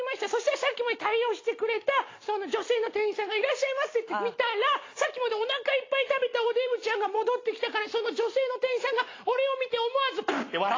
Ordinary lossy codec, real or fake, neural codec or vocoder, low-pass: AAC, 32 kbps; real; none; 7.2 kHz